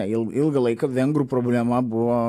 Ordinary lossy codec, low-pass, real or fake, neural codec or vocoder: AAC, 64 kbps; 14.4 kHz; real; none